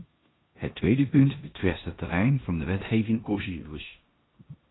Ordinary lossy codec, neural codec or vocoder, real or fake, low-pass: AAC, 16 kbps; codec, 16 kHz in and 24 kHz out, 0.9 kbps, LongCat-Audio-Codec, four codebook decoder; fake; 7.2 kHz